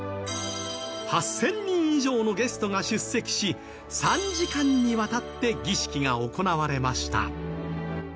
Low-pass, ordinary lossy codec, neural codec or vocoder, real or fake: none; none; none; real